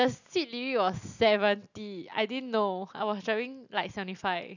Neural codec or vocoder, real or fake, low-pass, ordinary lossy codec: none; real; 7.2 kHz; none